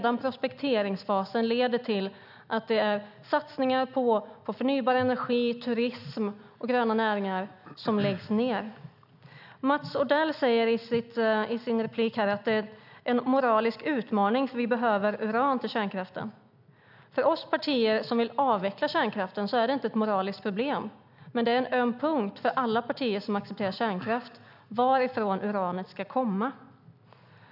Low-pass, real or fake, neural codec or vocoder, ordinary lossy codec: 5.4 kHz; real; none; none